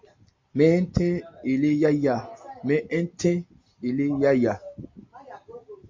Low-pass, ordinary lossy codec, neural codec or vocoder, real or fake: 7.2 kHz; MP3, 48 kbps; none; real